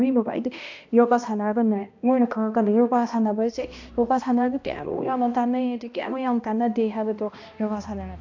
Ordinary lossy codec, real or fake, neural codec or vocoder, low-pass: AAC, 48 kbps; fake; codec, 16 kHz, 1 kbps, X-Codec, HuBERT features, trained on balanced general audio; 7.2 kHz